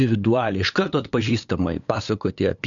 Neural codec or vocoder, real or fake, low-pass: codec, 16 kHz, 8 kbps, FunCodec, trained on LibriTTS, 25 frames a second; fake; 7.2 kHz